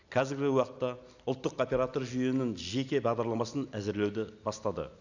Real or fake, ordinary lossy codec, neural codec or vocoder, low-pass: real; none; none; 7.2 kHz